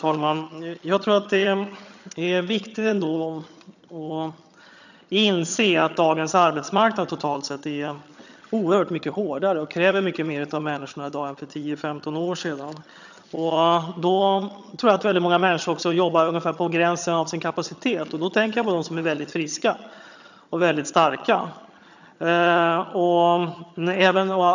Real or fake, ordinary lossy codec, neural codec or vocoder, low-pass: fake; none; vocoder, 22.05 kHz, 80 mel bands, HiFi-GAN; 7.2 kHz